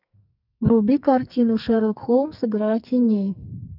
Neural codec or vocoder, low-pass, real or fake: codec, 44.1 kHz, 2.6 kbps, SNAC; 5.4 kHz; fake